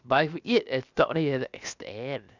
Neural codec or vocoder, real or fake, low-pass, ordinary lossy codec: codec, 16 kHz, 0.7 kbps, FocalCodec; fake; 7.2 kHz; none